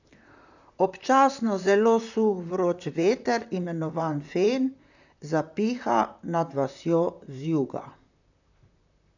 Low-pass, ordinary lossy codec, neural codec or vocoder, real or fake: 7.2 kHz; none; vocoder, 44.1 kHz, 128 mel bands, Pupu-Vocoder; fake